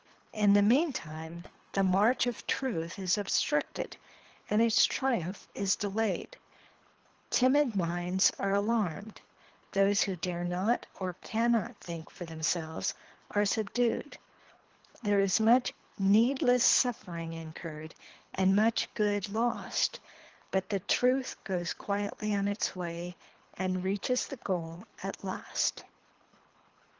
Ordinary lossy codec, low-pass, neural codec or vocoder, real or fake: Opus, 16 kbps; 7.2 kHz; codec, 24 kHz, 3 kbps, HILCodec; fake